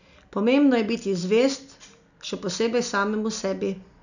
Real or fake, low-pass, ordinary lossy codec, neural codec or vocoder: real; 7.2 kHz; none; none